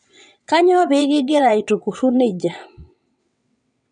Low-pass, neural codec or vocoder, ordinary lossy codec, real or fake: 9.9 kHz; vocoder, 22.05 kHz, 80 mel bands, WaveNeXt; none; fake